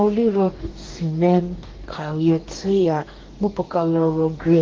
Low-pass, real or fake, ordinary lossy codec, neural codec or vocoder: 7.2 kHz; fake; Opus, 16 kbps; codec, 44.1 kHz, 2.6 kbps, DAC